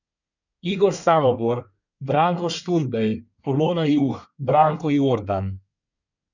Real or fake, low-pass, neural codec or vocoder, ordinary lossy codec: fake; 7.2 kHz; codec, 24 kHz, 1 kbps, SNAC; none